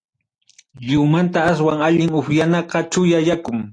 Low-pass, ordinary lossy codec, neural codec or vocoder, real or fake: 9.9 kHz; AAC, 48 kbps; none; real